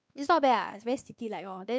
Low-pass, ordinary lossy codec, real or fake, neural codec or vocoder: none; none; fake; codec, 16 kHz, 2 kbps, X-Codec, WavLM features, trained on Multilingual LibriSpeech